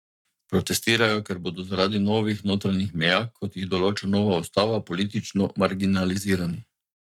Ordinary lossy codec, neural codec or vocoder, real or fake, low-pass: none; codec, 44.1 kHz, 7.8 kbps, Pupu-Codec; fake; 19.8 kHz